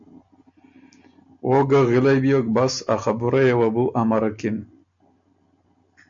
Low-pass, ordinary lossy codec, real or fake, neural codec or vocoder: 7.2 kHz; AAC, 64 kbps; real; none